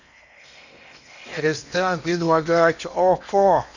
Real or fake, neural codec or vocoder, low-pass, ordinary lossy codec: fake; codec, 16 kHz in and 24 kHz out, 0.8 kbps, FocalCodec, streaming, 65536 codes; 7.2 kHz; none